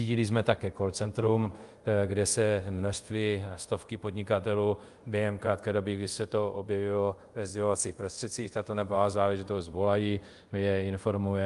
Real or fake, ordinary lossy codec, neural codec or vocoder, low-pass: fake; Opus, 32 kbps; codec, 24 kHz, 0.5 kbps, DualCodec; 10.8 kHz